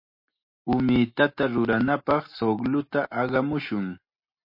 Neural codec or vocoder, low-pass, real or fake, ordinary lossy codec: none; 5.4 kHz; real; MP3, 32 kbps